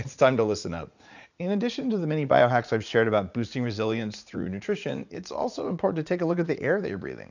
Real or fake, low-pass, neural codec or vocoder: fake; 7.2 kHz; vocoder, 44.1 kHz, 80 mel bands, Vocos